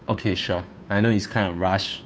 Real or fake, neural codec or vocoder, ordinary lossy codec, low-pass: fake; codec, 16 kHz, 2 kbps, FunCodec, trained on Chinese and English, 25 frames a second; none; none